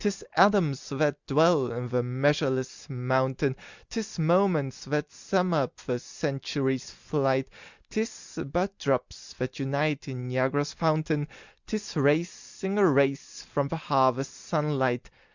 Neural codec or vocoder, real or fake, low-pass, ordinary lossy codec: none; real; 7.2 kHz; Opus, 64 kbps